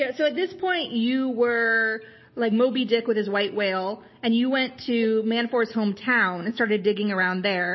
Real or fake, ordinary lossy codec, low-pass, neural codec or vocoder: real; MP3, 24 kbps; 7.2 kHz; none